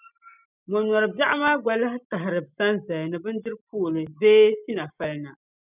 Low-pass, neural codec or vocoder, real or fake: 3.6 kHz; none; real